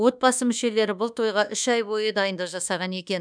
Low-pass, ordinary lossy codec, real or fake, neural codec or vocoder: 9.9 kHz; none; fake; codec, 24 kHz, 1.2 kbps, DualCodec